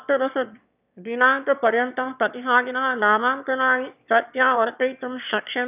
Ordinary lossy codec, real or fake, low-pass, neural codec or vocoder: none; fake; 3.6 kHz; autoencoder, 22.05 kHz, a latent of 192 numbers a frame, VITS, trained on one speaker